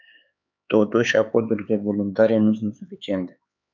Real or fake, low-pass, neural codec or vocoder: fake; 7.2 kHz; codec, 16 kHz, 4 kbps, X-Codec, HuBERT features, trained on LibriSpeech